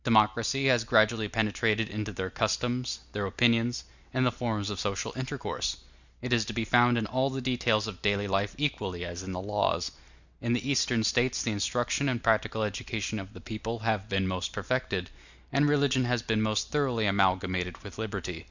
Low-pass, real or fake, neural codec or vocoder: 7.2 kHz; real; none